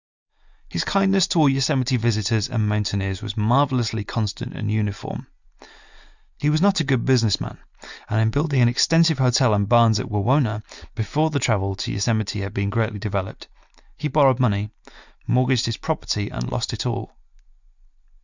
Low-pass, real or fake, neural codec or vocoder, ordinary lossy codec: 7.2 kHz; real; none; Opus, 64 kbps